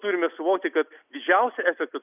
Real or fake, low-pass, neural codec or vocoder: real; 3.6 kHz; none